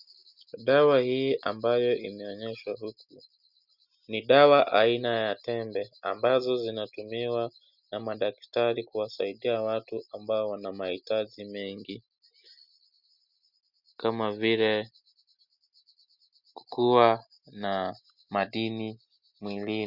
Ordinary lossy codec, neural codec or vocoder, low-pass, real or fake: Opus, 64 kbps; none; 5.4 kHz; real